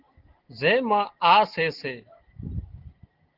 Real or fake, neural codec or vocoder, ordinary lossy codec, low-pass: real; none; Opus, 16 kbps; 5.4 kHz